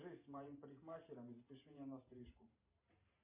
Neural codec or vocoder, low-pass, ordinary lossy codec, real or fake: vocoder, 44.1 kHz, 128 mel bands every 512 samples, BigVGAN v2; 3.6 kHz; MP3, 32 kbps; fake